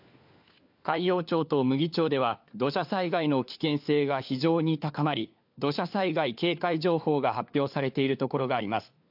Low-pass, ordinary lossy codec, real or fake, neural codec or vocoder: 5.4 kHz; AAC, 48 kbps; fake; codec, 16 kHz, 4 kbps, FunCodec, trained on LibriTTS, 50 frames a second